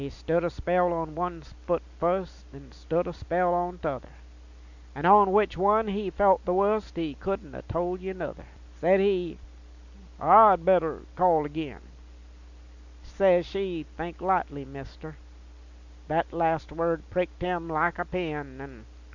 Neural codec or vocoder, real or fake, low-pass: none; real; 7.2 kHz